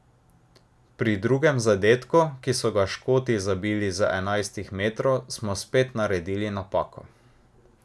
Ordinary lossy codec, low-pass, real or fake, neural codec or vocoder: none; none; real; none